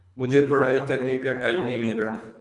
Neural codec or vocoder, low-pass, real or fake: codec, 24 kHz, 1.5 kbps, HILCodec; 10.8 kHz; fake